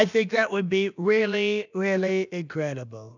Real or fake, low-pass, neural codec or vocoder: fake; 7.2 kHz; codec, 16 kHz, about 1 kbps, DyCAST, with the encoder's durations